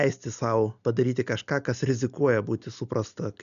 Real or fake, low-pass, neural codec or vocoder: real; 7.2 kHz; none